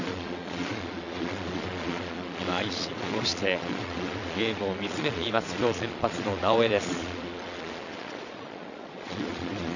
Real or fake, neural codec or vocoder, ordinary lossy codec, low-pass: fake; vocoder, 22.05 kHz, 80 mel bands, Vocos; none; 7.2 kHz